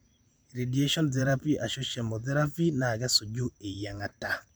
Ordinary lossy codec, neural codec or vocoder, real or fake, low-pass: none; vocoder, 44.1 kHz, 128 mel bands every 512 samples, BigVGAN v2; fake; none